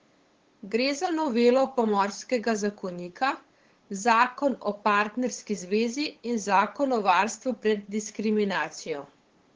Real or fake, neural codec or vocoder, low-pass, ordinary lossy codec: fake; codec, 16 kHz, 8 kbps, FunCodec, trained on LibriTTS, 25 frames a second; 7.2 kHz; Opus, 16 kbps